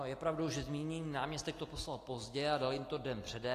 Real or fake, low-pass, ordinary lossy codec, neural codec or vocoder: real; 14.4 kHz; AAC, 48 kbps; none